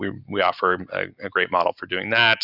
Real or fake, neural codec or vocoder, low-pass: real; none; 5.4 kHz